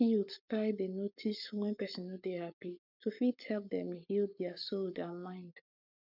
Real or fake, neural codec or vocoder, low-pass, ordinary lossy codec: fake; codec, 44.1 kHz, 7.8 kbps, Pupu-Codec; 5.4 kHz; none